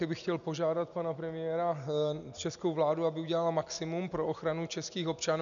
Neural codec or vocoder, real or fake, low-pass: none; real; 7.2 kHz